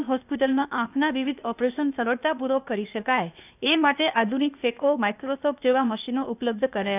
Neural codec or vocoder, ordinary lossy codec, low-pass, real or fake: codec, 16 kHz, 0.8 kbps, ZipCodec; none; 3.6 kHz; fake